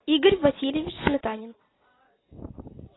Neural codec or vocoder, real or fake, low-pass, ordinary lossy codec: none; real; 7.2 kHz; AAC, 16 kbps